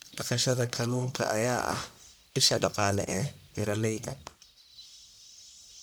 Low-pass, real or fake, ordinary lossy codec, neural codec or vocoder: none; fake; none; codec, 44.1 kHz, 1.7 kbps, Pupu-Codec